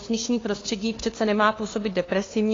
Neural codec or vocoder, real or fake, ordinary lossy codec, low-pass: codec, 16 kHz, 2 kbps, FunCodec, trained on LibriTTS, 25 frames a second; fake; AAC, 32 kbps; 7.2 kHz